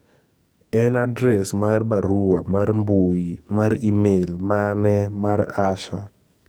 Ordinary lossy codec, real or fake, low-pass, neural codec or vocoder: none; fake; none; codec, 44.1 kHz, 2.6 kbps, SNAC